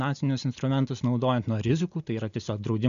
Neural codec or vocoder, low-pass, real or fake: none; 7.2 kHz; real